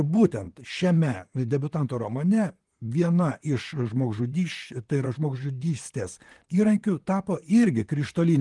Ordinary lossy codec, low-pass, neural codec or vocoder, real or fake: Opus, 24 kbps; 10.8 kHz; vocoder, 44.1 kHz, 128 mel bands, Pupu-Vocoder; fake